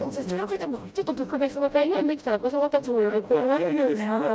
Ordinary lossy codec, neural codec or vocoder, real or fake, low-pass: none; codec, 16 kHz, 0.5 kbps, FreqCodec, smaller model; fake; none